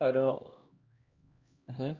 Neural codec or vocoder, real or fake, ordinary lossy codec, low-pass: codec, 16 kHz, 1 kbps, X-Codec, HuBERT features, trained on LibriSpeech; fake; Opus, 64 kbps; 7.2 kHz